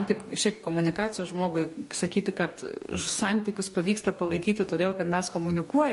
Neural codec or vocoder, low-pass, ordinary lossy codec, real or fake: codec, 44.1 kHz, 2.6 kbps, DAC; 14.4 kHz; MP3, 48 kbps; fake